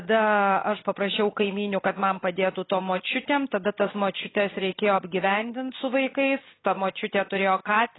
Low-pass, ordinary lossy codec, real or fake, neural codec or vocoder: 7.2 kHz; AAC, 16 kbps; real; none